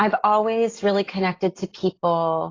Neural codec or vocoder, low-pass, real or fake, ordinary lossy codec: none; 7.2 kHz; real; AAC, 32 kbps